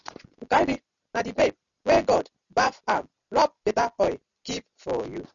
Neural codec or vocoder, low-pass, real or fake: none; 7.2 kHz; real